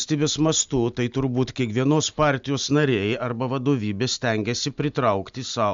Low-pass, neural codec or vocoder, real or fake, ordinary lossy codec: 7.2 kHz; none; real; MP3, 64 kbps